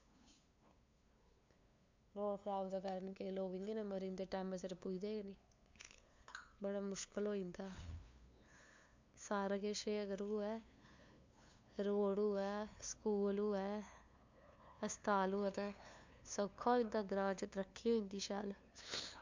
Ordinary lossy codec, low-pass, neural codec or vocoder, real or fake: MP3, 64 kbps; 7.2 kHz; codec, 16 kHz, 2 kbps, FunCodec, trained on LibriTTS, 25 frames a second; fake